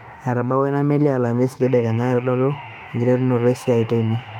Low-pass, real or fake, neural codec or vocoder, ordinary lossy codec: 19.8 kHz; fake; autoencoder, 48 kHz, 32 numbers a frame, DAC-VAE, trained on Japanese speech; none